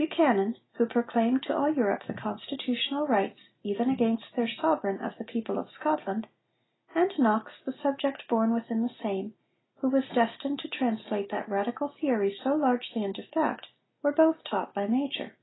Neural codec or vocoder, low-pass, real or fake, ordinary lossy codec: none; 7.2 kHz; real; AAC, 16 kbps